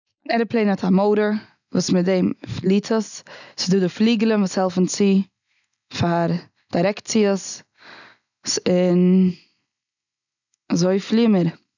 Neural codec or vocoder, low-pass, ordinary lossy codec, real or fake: none; 7.2 kHz; none; real